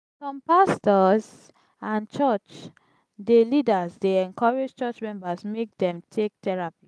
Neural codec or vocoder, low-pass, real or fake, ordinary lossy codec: none; none; real; none